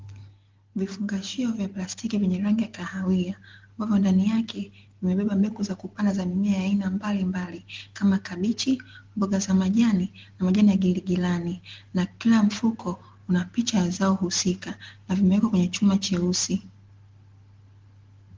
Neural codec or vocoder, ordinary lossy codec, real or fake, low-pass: none; Opus, 16 kbps; real; 7.2 kHz